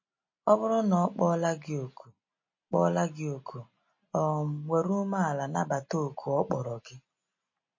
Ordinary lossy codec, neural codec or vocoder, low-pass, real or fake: MP3, 32 kbps; none; 7.2 kHz; real